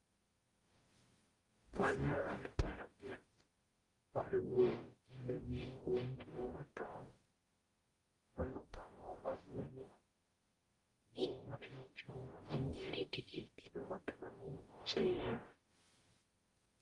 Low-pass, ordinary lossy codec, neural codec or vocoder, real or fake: 10.8 kHz; Opus, 24 kbps; codec, 44.1 kHz, 0.9 kbps, DAC; fake